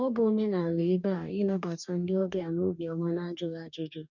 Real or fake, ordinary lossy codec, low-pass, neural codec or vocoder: fake; none; 7.2 kHz; codec, 44.1 kHz, 2.6 kbps, DAC